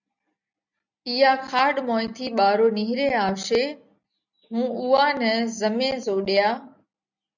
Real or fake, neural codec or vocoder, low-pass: real; none; 7.2 kHz